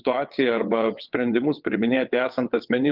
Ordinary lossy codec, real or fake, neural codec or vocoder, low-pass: Opus, 32 kbps; fake; vocoder, 22.05 kHz, 80 mel bands, WaveNeXt; 5.4 kHz